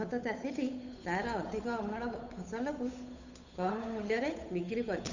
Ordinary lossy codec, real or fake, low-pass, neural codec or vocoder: none; fake; 7.2 kHz; codec, 16 kHz, 8 kbps, FunCodec, trained on Chinese and English, 25 frames a second